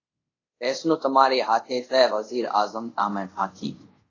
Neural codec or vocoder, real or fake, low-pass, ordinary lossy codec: codec, 24 kHz, 0.5 kbps, DualCodec; fake; 7.2 kHz; AAC, 32 kbps